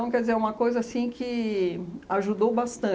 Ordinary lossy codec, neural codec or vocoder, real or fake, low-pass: none; none; real; none